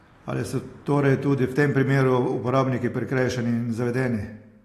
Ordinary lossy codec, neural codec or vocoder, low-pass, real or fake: AAC, 48 kbps; none; 14.4 kHz; real